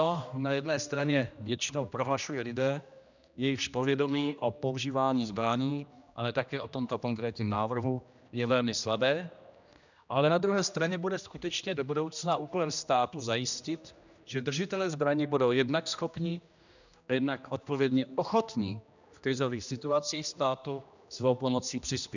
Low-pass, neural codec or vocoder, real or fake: 7.2 kHz; codec, 16 kHz, 1 kbps, X-Codec, HuBERT features, trained on general audio; fake